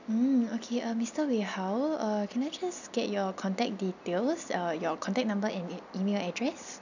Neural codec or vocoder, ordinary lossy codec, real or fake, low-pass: none; none; real; 7.2 kHz